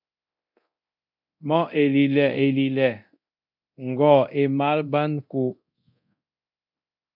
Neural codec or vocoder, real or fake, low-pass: codec, 24 kHz, 0.9 kbps, DualCodec; fake; 5.4 kHz